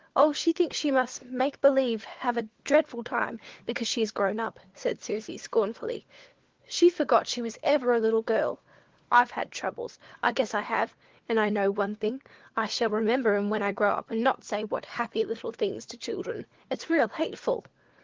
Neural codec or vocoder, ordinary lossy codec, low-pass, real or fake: codec, 16 kHz, 4 kbps, FunCodec, trained on LibriTTS, 50 frames a second; Opus, 16 kbps; 7.2 kHz; fake